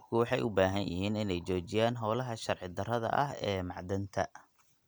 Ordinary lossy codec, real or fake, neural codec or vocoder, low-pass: none; real; none; none